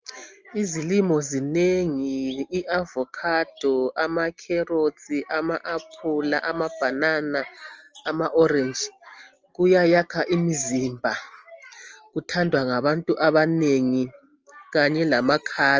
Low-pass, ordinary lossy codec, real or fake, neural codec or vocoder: 7.2 kHz; Opus, 24 kbps; real; none